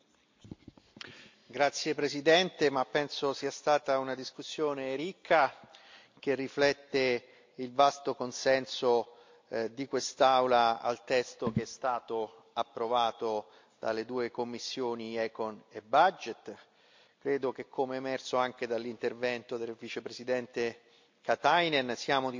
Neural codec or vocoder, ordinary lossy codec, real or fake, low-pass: none; MP3, 48 kbps; real; 7.2 kHz